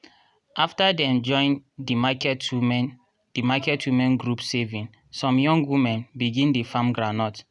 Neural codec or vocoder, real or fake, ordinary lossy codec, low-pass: none; real; none; 10.8 kHz